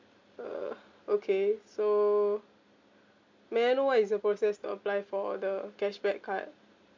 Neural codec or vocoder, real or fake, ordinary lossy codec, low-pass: none; real; MP3, 64 kbps; 7.2 kHz